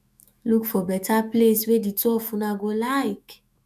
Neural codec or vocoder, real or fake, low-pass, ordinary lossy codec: autoencoder, 48 kHz, 128 numbers a frame, DAC-VAE, trained on Japanese speech; fake; 14.4 kHz; none